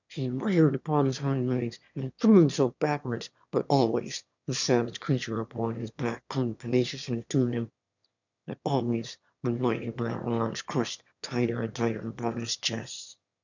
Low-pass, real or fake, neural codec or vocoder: 7.2 kHz; fake; autoencoder, 22.05 kHz, a latent of 192 numbers a frame, VITS, trained on one speaker